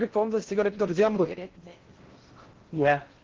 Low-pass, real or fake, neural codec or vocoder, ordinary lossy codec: 7.2 kHz; fake; codec, 16 kHz in and 24 kHz out, 0.6 kbps, FocalCodec, streaming, 4096 codes; Opus, 16 kbps